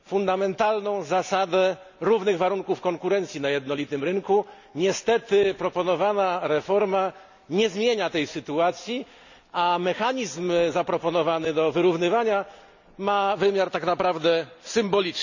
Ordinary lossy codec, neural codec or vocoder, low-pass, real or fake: none; none; 7.2 kHz; real